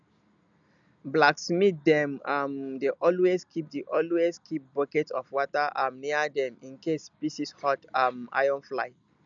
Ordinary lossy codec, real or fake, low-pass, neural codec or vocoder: none; real; 7.2 kHz; none